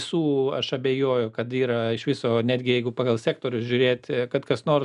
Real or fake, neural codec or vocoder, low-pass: real; none; 10.8 kHz